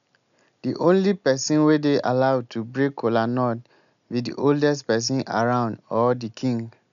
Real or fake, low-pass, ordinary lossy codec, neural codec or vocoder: real; 7.2 kHz; none; none